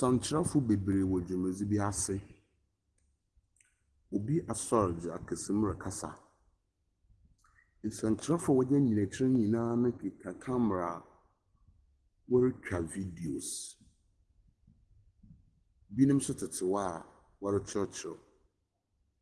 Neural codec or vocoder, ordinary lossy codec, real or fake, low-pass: none; Opus, 16 kbps; real; 9.9 kHz